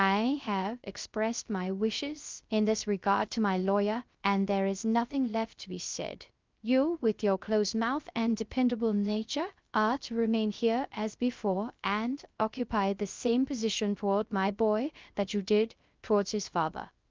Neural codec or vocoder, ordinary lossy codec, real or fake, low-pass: codec, 16 kHz, 0.3 kbps, FocalCodec; Opus, 24 kbps; fake; 7.2 kHz